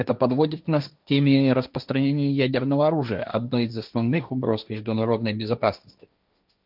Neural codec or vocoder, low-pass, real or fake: codec, 16 kHz, 1.1 kbps, Voila-Tokenizer; 5.4 kHz; fake